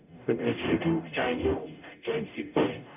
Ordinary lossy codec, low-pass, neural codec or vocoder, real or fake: none; 3.6 kHz; codec, 44.1 kHz, 0.9 kbps, DAC; fake